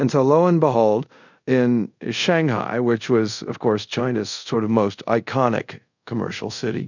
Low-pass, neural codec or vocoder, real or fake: 7.2 kHz; codec, 24 kHz, 0.5 kbps, DualCodec; fake